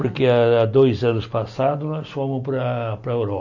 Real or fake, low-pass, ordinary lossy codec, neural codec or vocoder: real; 7.2 kHz; none; none